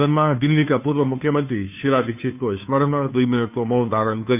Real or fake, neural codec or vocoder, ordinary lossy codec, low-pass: fake; codec, 16 kHz, 2 kbps, FunCodec, trained on Chinese and English, 25 frames a second; none; 3.6 kHz